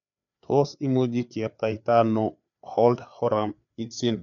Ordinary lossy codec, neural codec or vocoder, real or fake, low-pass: none; codec, 16 kHz, 4 kbps, FreqCodec, larger model; fake; 7.2 kHz